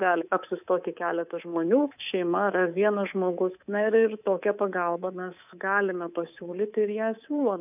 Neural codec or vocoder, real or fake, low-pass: codec, 24 kHz, 3.1 kbps, DualCodec; fake; 3.6 kHz